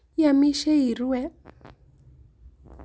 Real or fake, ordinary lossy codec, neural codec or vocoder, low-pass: real; none; none; none